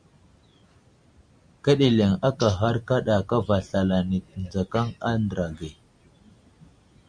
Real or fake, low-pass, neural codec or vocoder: real; 9.9 kHz; none